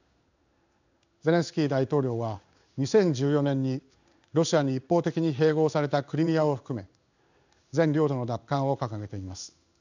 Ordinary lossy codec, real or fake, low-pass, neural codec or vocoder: none; fake; 7.2 kHz; codec, 16 kHz in and 24 kHz out, 1 kbps, XY-Tokenizer